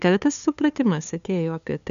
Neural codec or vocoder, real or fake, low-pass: codec, 16 kHz, 2 kbps, FunCodec, trained on LibriTTS, 25 frames a second; fake; 7.2 kHz